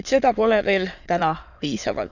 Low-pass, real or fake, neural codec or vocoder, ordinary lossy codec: 7.2 kHz; fake; autoencoder, 22.05 kHz, a latent of 192 numbers a frame, VITS, trained on many speakers; none